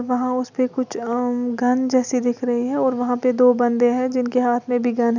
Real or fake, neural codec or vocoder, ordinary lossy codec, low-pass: real; none; none; 7.2 kHz